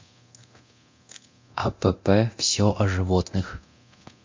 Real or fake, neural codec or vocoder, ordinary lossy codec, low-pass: fake; codec, 24 kHz, 0.9 kbps, DualCodec; MP3, 48 kbps; 7.2 kHz